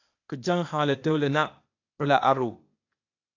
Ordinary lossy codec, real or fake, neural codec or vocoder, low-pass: AAC, 48 kbps; fake; codec, 16 kHz, 0.8 kbps, ZipCodec; 7.2 kHz